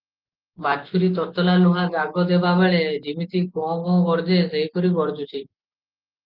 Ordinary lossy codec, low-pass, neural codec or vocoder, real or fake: Opus, 32 kbps; 5.4 kHz; none; real